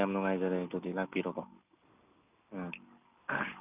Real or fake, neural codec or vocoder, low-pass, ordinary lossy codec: real; none; 3.6 kHz; none